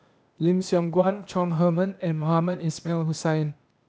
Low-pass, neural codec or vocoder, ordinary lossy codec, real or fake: none; codec, 16 kHz, 0.8 kbps, ZipCodec; none; fake